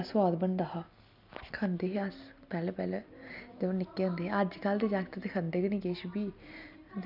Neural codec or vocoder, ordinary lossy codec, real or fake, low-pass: none; none; real; 5.4 kHz